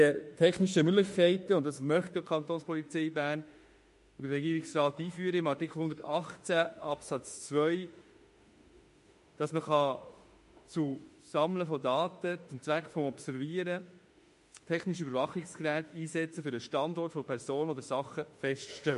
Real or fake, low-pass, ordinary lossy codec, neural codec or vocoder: fake; 14.4 kHz; MP3, 48 kbps; autoencoder, 48 kHz, 32 numbers a frame, DAC-VAE, trained on Japanese speech